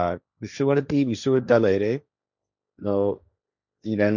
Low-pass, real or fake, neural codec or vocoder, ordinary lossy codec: 7.2 kHz; fake; codec, 16 kHz, 1.1 kbps, Voila-Tokenizer; none